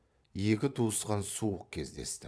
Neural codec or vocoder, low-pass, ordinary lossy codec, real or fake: vocoder, 22.05 kHz, 80 mel bands, Vocos; none; none; fake